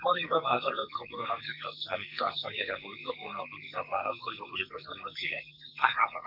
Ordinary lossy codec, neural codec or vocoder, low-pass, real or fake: none; codec, 44.1 kHz, 2.6 kbps, SNAC; 5.4 kHz; fake